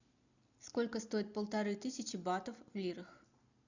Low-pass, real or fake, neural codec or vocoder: 7.2 kHz; real; none